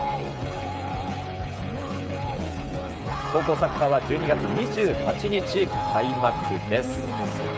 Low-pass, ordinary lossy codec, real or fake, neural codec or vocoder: none; none; fake; codec, 16 kHz, 8 kbps, FreqCodec, smaller model